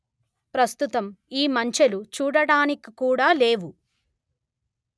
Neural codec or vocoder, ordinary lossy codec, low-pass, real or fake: none; none; none; real